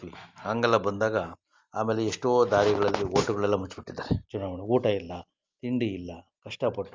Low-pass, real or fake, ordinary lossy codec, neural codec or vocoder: 7.2 kHz; real; Opus, 32 kbps; none